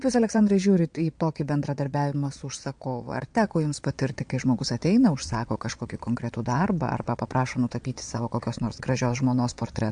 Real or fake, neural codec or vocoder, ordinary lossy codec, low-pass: fake; vocoder, 22.05 kHz, 80 mel bands, Vocos; MP3, 64 kbps; 9.9 kHz